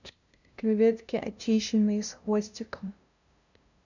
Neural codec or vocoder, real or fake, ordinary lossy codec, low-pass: codec, 16 kHz, 0.5 kbps, FunCodec, trained on LibriTTS, 25 frames a second; fake; none; 7.2 kHz